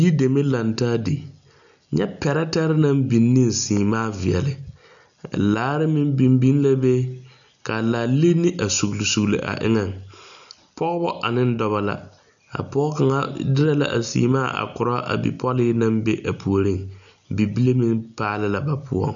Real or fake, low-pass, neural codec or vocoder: real; 7.2 kHz; none